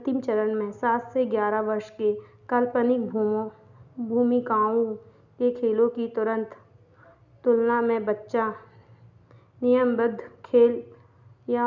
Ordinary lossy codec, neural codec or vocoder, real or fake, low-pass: none; none; real; 7.2 kHz